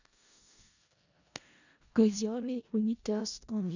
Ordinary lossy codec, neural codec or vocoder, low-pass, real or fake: none; codec, 16 kHz in and 24 kHz out, 0.4 kbps, LongCat-Audio-Codec, four codebook decoder; 7.2 kHz; fake